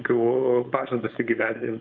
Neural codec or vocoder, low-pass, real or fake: codec, 16 kHz, 4.8 kbps, FACodec; 7.2 kHz; fake